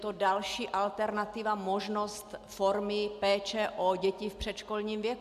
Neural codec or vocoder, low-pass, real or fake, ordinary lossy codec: none; 14.4 kHz; real; MP3, 96 kbps